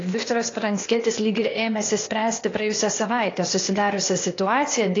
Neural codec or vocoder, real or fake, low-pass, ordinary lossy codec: codec, 16 kHz, 0.8 kbps, ZipCodec; fake; 7.2 kHz; AAC, 32 kbps